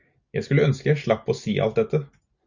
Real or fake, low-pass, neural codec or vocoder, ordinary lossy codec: real; 7.2 kHz; none; Opus, 64 kbps